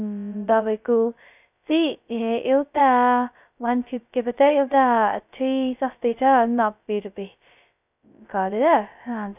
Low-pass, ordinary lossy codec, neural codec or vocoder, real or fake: 3.6 kHz; none; codec, 16 kHz, 0.2 kbps, FocalCodec; fake